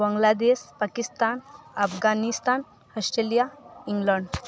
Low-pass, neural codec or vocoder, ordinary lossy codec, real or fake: none; none; none; real